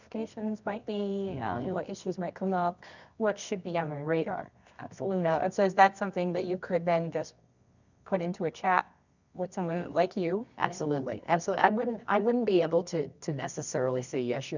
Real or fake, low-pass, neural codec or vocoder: fake; 7.2 kHz; codec, 24 kHz, 0.9 kbps, WavTokenizer, medium music audio release